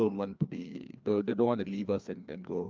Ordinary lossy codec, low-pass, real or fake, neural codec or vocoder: Opus, 24 kbps; 7.2 kHz; fake; codec, 16 kHz, 2 kbps, FreqCodec, larger model